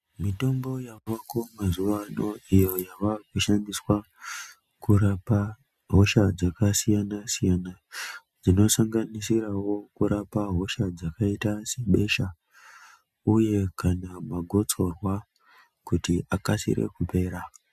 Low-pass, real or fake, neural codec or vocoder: 14.4 kHz; real; none